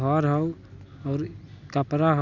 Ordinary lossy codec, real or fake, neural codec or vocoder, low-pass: none; real; none; 7.2 kHz